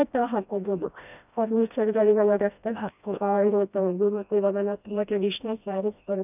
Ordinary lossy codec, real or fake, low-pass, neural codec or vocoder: none; fake; 3.6 kHz; codec, 16 kHz, 1 kbps, FreqCodec, smaller model